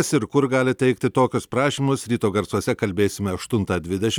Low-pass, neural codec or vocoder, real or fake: 19.8 kHz; none; real